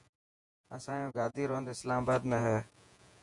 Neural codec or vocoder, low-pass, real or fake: vocoder, 48 kHz, 128 mel bands, Vocos; 10.8 kHz; fake